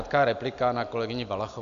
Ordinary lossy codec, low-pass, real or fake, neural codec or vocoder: AAC, 96 kbps; 7.2 kHz; real; none